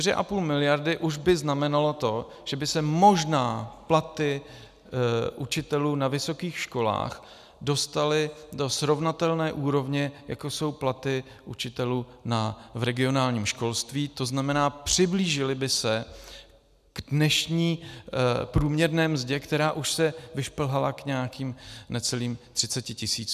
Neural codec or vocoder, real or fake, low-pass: none; real; 14.4 kHz